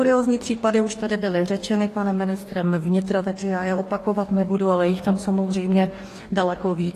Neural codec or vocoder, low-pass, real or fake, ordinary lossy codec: codec, 44.1 kHz, 2.6 kbps, DAC; 14.4 kHz; fake; AAC, 48 kbps